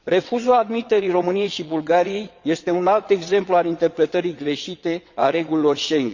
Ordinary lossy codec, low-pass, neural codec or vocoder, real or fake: Opus, 64 kbps; 7.2 kHz; vocoder, 22.05 kHz, 80 mel bands, WaveNeXt; fake